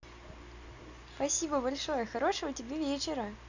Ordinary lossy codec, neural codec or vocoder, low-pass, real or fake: none; none; 7.2 kHz; real